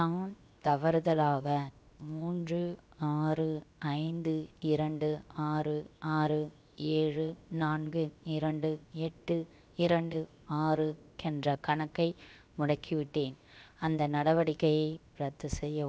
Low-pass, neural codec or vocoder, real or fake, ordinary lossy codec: none; codec, 16 kHz, 0.7 kbps, FocalCodec; fake; none